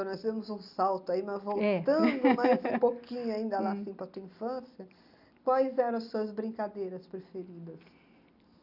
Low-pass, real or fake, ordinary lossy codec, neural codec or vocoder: 5.4 kHz; real; none; none